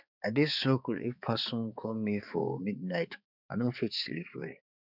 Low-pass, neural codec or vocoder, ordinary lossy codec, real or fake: 5.4 kHz; codec, 16 kHz, 4 kbps, X-Codec, HuBERT features, trained on balanced general audio; none; fake